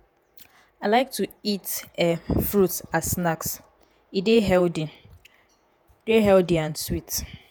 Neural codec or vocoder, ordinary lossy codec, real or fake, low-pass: vocoder, 48 kHz, 128 mel bands, Vocos; none; fake; none